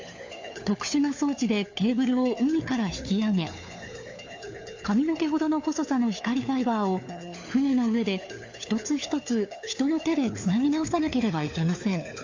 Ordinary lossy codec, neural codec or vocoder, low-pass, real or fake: AAC, 48 kbps; codec, 16 kHz, 4 kbps, FunCodec, trained on LibriTTS, 50 frames a second; 7.2 kHz; fake